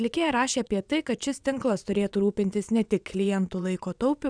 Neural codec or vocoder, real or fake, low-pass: vocoder, 22.05 kHz, 80 mel bands, WaveNeXt; fake; 9.9 kHz